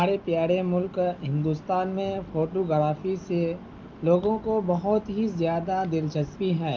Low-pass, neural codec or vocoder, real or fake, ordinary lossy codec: 7.2 kHz; none; real; Opus, 32 kbps